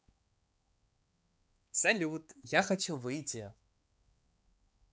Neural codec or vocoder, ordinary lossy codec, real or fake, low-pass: codec, 16 kHz, 4 kbps, X-Codec, HuBERT features, trained on balanced general audio; none; fake; none